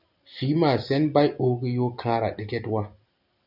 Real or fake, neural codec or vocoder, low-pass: real; none; 5.4 kHz